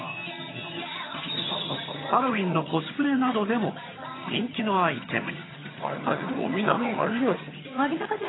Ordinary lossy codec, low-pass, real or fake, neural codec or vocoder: AAC, 16 kbps; 7.2 kHz; fake; vocoder, 22.05 kHz, 80 mel bands, HiFi-GAN